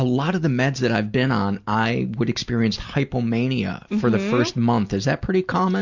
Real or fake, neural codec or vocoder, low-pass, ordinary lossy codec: real; none; 7.2 kHz; Opus, 64 kbps